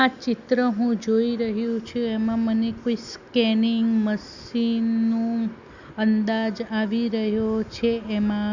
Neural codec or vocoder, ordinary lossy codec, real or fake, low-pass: none; Opus, 64 kbps; real; 7.2 kHz